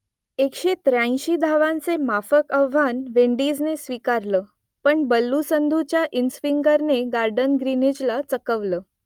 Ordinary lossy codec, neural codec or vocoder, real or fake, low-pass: Opus, 32 kbps; none; real; 19.8 kHz